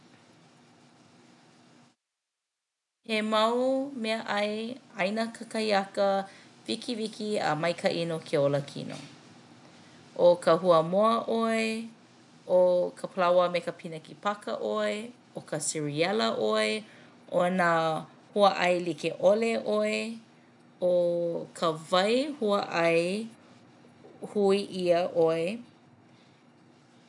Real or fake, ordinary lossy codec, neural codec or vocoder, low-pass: real; none; none; 10.8 kHz